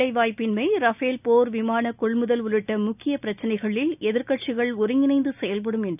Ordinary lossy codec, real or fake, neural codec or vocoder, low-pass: none; real; none; 3.6 kHz